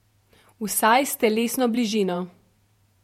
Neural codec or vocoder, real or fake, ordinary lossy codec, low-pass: vocoder, 48 kHz, 128 mel bands, Vocos; fake; MP3, 64 kbps; 19.8 kHz